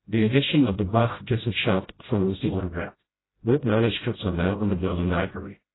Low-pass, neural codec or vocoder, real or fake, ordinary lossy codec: 7.2 kHz; codec, 16 kHz, 0.5 kbps, FreqCodec, smaller model; fake; AAC, 16 kbps